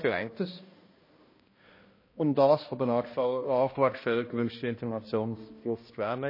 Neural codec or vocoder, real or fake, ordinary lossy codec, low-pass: codec, 16 kHz, 1 kbps, X-Codec, HuBERT features, trained on balanced general audio; fake; MP3, 24 kbps; 5.4 kHz